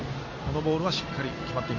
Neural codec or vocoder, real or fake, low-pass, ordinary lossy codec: none; real; 7.2 kHz; MP3, 32 kbps